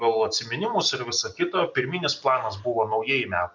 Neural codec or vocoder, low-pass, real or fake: none; 7.2 kHz; real